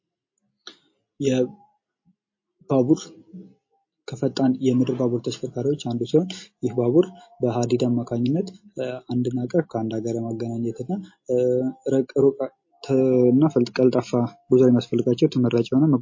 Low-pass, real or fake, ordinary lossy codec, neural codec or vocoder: 7.2 kHz; real; MP3, 32 kbps; none